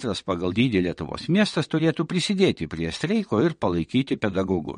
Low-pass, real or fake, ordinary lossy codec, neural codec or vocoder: 9.9 kHz; fake; MP3, 48 kbps; vocoder, 22.05 kHz, 80 mel bands, WaveNeXt